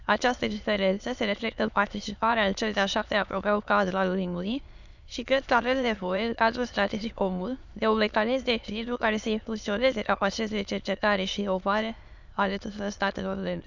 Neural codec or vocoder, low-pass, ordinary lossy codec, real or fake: autoencoder, 22.05 kHz, a latent of 192 numbers a frame, VITS, trained on many speakers; 7.2 kHz; none; fake